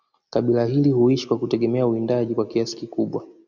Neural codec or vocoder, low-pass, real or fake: none; 7.2 kHz; real